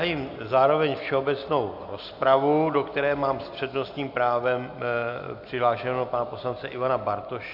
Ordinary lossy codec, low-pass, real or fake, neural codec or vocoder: AAC, 48 kbps; 5.4 kHz; real; none